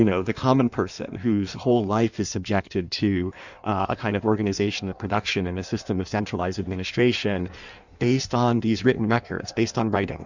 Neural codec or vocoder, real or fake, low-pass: codec, 16 kHz in and 24 kHz out, 1.1 kbps, FireRedTTS-2 codec; fake; 7.2 kHz